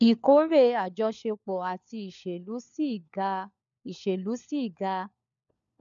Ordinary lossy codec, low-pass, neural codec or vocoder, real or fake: MP3, 96 kbps; 7.2 kHz; codec, 16 kHz, 4 kbps, FunCodec, trained on LibriTTS, 50 frames a second; fake